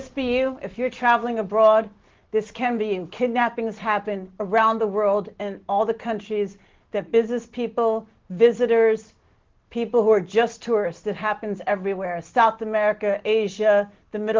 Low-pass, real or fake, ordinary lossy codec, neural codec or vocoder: 7.2 kHz; real; Opus, 16 kbps; none